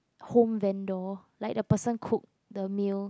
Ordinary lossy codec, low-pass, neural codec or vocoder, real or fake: none; none; none; real